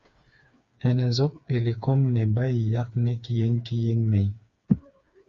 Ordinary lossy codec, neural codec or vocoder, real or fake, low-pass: Opus, 64 kbps; codec, 16 kHz, 4 kbps, FreqCodec, smaller model; fake; 7.2 kHz